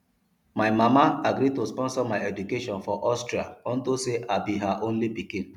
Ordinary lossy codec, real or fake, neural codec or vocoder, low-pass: none; real; none; 19.8 kHz